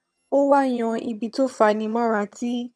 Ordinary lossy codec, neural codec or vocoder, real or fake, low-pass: none; vocoder, 22.05 kHz, 80 mel bands, HiFi-GAN; fake; none